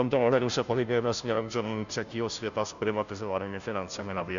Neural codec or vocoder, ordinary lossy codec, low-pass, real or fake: codec, 16 kHz, 0.5 kbps, FunCodec, trained on Chinese and English, 25 frames a second; MP3, 96 kbps; 7.2 kHz; fake